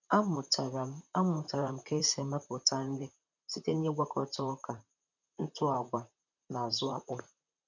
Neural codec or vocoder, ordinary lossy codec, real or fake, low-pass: vocoder, 44.1 kHz, 128 mel bands, Pupu-Vocoder; none; fake; 7.2 kHz